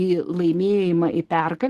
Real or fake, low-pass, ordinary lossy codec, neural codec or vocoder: real; 14.4 kHz; Opus, 16 kbps; none